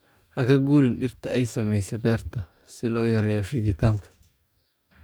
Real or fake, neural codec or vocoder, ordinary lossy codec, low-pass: fake; codec, 44.1 kHz, 2.6 kbps, DAC; none; none